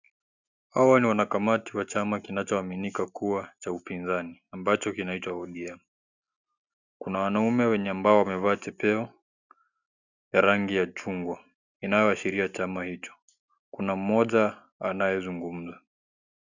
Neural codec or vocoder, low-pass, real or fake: none; 7.2 kHz; real